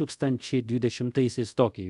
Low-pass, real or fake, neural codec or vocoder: 10.8 kHz; fake; codec, 24 kHz, 0.5 kbps, DualCodec